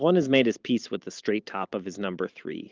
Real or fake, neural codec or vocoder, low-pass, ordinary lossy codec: fake; vocoder, 44.1 kHz, 128 mel bands every 512 samples, BigVGAN v2; 7.2 kHz; Opus, 24 kbps